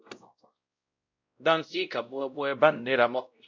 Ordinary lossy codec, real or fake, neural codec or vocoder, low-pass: MP3, 64 kbps; fake; codec, 16 kHz, 0.5 kbps, X-Codec, WavLM features, trained on Multilingual LibriSpeech; 7.2 kHz